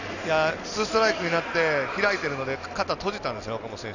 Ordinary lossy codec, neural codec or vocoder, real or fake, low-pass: none; none; real; 7.2 kHz